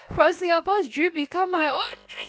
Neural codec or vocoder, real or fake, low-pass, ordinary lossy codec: codec, 16 kHz, about 1 kbps, DyCAST, with the encoder's durations; fake; none; none